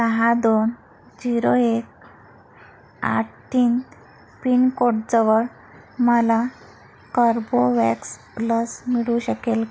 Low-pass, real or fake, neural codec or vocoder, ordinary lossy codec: none; real; none; none